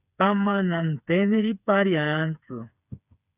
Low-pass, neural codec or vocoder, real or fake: 3.6 kHz; codec, 16 kHz, 4 kbps, FreqCodec, smaller model; fake